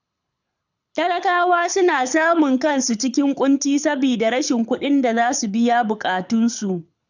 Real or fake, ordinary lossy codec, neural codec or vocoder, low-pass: fake; none; codec, 24 kHz, 6 kbps, HILCodec; 7.2 kHz